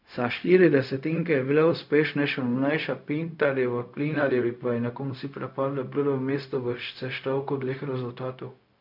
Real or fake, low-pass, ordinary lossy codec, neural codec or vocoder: fake; 5.4 kHz; none; codec, 16 kHz, 0.4 kbps, LongCat-Audio-Codec